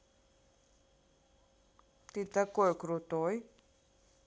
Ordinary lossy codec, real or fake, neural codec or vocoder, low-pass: none; real; none; none